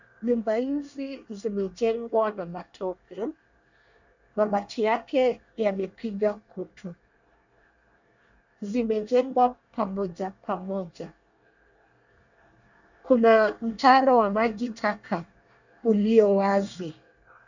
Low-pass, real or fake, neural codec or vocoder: 7.2 kHz; fake; codec, 24 kHz, 1 kbps, SNAC